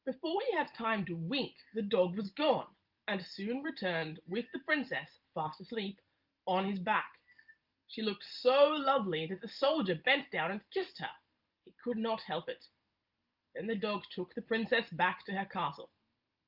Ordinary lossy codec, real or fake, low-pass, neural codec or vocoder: Opus, 24 kbps; real; 5.4 kHz; none